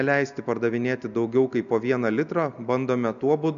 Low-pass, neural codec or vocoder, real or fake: 7.2 kHz; none; real